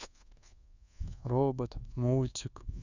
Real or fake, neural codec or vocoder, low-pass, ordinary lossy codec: fake; codec, 24 kHz, 1.2 kbps, DualCodec; 7.2 kHz; none